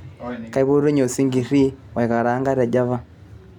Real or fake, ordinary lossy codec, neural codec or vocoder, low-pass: real; none; none; 19.8 kHz